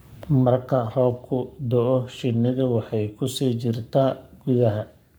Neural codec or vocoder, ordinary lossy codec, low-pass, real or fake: codec, 44.1 kHz, 7.8 kbps, Pupu-Codec; none; none; fake